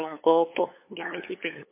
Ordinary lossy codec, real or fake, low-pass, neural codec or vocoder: MP3, 24 kbps; fake; 3.6 kHz; codec, 16 kHz, 8 kbps, FunCodec, trained on LibriTTS, 25 frames a second